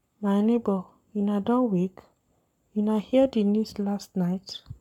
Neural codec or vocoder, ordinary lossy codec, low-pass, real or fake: codec, 44.1 kHz, 7.8 kbps, Pupu-Codec; MP3, 96 kbps; 19.8 kHz; fake